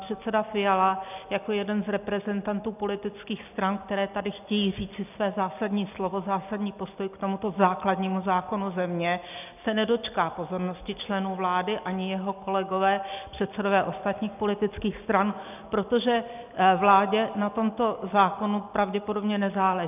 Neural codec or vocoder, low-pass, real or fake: none; 3.6 kHz; real